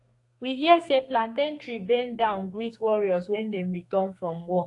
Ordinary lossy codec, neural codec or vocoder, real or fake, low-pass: AAC, 48 kbps; codec, 32 kHz, 1.9 kbps, SNAC; fake; 10.8 kHz